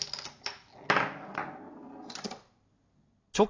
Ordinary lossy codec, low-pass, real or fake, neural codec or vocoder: AAC, 32 kbps; 7.2 kHz; real; none